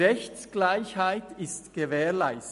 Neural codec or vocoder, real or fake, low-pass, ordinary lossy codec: none; real; 14.4 kHz; MP3, 48 kbps